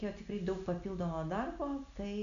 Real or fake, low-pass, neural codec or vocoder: real; 7.2 kHz; none